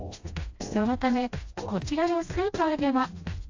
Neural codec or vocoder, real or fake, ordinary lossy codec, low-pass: codec, 16 kHz, 1 kbps, FreqCodec, smaller model; fake; AAC, 48 kbps; 7.2 kHz